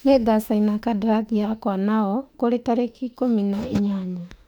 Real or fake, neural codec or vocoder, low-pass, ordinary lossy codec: fake; autoencoder, 48 kHz, 32 numbers a frame, DAC-VAE, trained on Japanese speech; 19.8 kHz; none